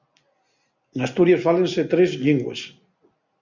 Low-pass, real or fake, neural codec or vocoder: 7.2 kHz; real; none